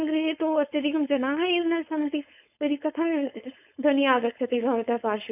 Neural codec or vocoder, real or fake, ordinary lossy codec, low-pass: codec, 16 kHz, 4.8 kbps, FACodec; fake; none; 3.6 kHz